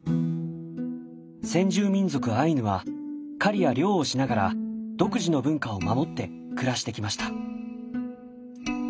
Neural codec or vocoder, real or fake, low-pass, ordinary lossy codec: none; real; none; none